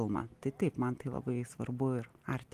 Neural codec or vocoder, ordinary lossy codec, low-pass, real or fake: none; Opus, 24 kbps; 14.4 kHz; real